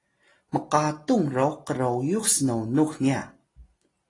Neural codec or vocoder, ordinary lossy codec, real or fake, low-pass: none; AAC, 32 kbps; real; 10.8 kHz